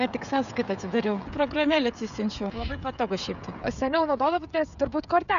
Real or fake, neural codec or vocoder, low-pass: fake; codec, 16 kHz, 16 kbps, FreqCodec, smaller model; 7.2 kHz